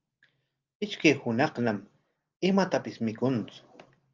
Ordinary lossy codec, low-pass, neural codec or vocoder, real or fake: Opus, 24 kbps; 7.2 kHz; none; real